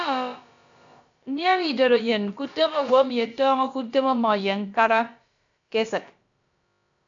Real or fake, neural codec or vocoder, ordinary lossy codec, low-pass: fake; codec, 16 kHz, about 1 kbps, DyCAST, with the encoder's durations; AAC, 64 kbps; 7.2 kHz